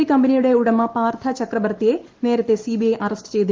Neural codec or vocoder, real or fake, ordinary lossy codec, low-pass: none; real; Opus, 16 kbps; 7.2 kHz